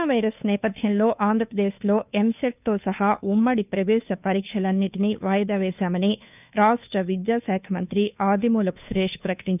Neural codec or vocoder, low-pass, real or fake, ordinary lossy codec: codec, 16 kHz, 2 kbps, FunCodec, trained on Chinese and English, 25 frames a second; 3.6 kHz; fake; none